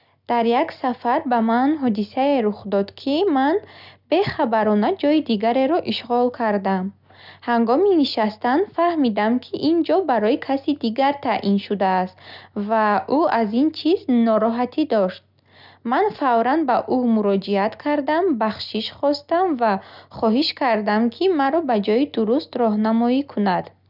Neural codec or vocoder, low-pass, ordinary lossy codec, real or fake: none; 5.4 kHz; none; real